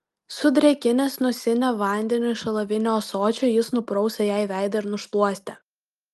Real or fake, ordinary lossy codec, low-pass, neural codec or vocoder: real; Opus, 32 kbps; 14.4 kHz; none